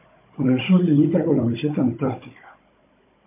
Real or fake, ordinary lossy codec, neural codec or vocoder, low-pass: fake; MP3, 24 kbps; codec, 16 kHz, 16 kbps, FunCodec, trained on Chinese and English, 50 frames a second; 3.6 kHz